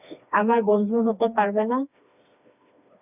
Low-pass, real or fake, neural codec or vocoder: 3.6 kHz; fake; codec, 16 kHz, 2 kbps, FreqCodec, smaller model